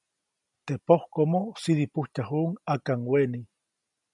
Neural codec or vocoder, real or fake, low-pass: none; real; 10.8 kHz